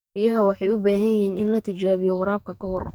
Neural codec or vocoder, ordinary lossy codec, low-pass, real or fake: codec, 44.1 kHz, 2.6 kbps, SNAC; none; none; fake